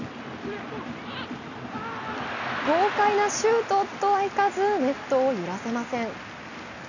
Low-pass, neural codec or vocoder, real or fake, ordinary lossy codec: 7.2 kHz; none; real; none